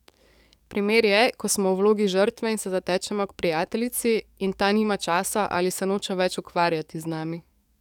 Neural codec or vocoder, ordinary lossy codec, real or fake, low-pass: codec, 44.1 kHz, 7.8 kbps, DAC; none; fake; 19.8 kHz